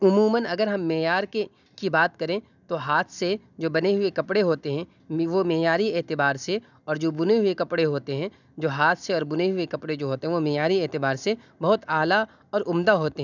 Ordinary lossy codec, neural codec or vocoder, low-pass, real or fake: none; none; 7.2 kHz; real